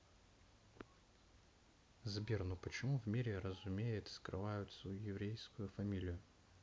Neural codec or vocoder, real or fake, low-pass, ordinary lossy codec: none; real; none; none